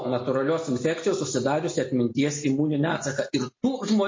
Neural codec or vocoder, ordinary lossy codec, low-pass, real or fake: none; MP3, 32 kbps; 7.2 kHz; real